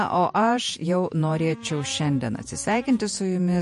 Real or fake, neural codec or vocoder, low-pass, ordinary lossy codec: fake; vocoder, 48 kHz, 128 mel bands, Vocos; 14.4 kHz; MP3, 48 kbps